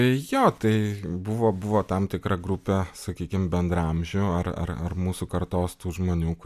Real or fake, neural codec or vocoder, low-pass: real; none; 14.4 kHz